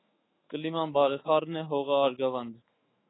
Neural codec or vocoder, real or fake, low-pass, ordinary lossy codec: autoencoder, 48 kHz, 128 numbers a frame, DAC-VAE, trained on Japanese speech; fake; 7.2 kHz; AAC, 16 kbps